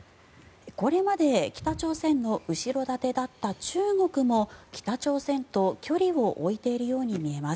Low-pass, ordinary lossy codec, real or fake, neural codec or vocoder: none; none; real; none